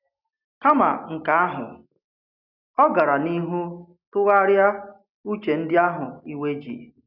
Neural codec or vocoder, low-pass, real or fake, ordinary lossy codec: none; 5.4 kHz; real; none